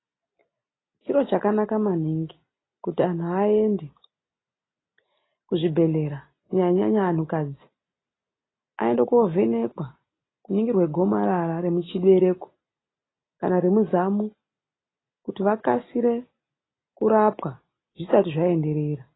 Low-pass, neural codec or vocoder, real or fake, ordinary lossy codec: 7.2 kHz; none; real; AAC, 16 kbps